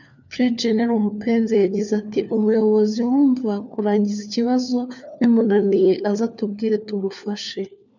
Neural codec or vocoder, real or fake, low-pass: codec, 16 kHz, 4 kbps, FunCodec, trained on LibriTTS, 50 frames a second; fake; 7.2 kHz